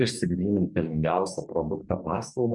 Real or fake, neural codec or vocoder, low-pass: fake; codec, 44.1 kHz, 2.6 kbps, DAC; 10.8 kHz